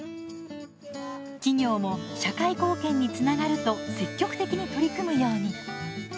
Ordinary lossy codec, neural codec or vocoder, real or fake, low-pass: none; none; real; none